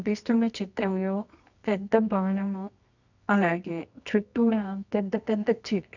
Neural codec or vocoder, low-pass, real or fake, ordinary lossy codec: codec, 24 kHz, 0.9 kbps, WavTokenizer, medium music audio release; 7.2 kHz; fake; none